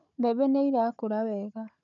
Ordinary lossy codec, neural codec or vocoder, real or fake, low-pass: none; codec, 16 kHz, 4 kbps, FunCodec, trained on Chinese and English, 50 frames a second; fake; 7.2 kHz